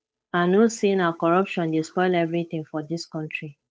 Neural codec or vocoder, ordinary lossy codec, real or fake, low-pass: codec, 16 kHz, 8 kbps, FunCodec, trained on Chinese and English, 25 frames a second; none; fake; none